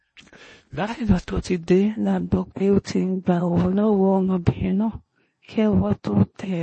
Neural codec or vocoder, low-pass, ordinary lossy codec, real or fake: codec, 16 kHz in and 24 kHz out, 0.8 kbps, FocalCodec, streaming, 65536 codes; 10.8 kHz; MP3, 32 kbps; fake